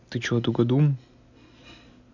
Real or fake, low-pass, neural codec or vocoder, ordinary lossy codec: real; 7.2 kHz; none; none